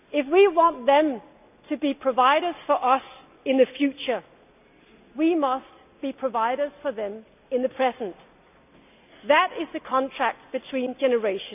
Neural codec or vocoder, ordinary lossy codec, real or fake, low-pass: none; none; real; 3.6 kHz